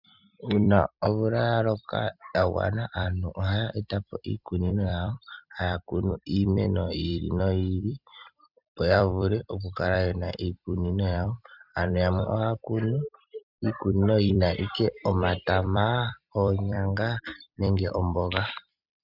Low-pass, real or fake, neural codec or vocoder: 5.4 kHz; fake; vocoder, 44.1 kHz, 128 mel bands every 256 samples, BigVGAN v2